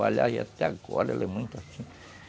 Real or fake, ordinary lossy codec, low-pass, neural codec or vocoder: real; none; none; none